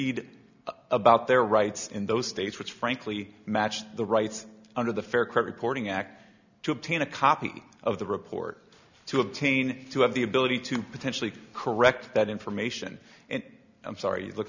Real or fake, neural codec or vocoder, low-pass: real; none; 7.2 kHz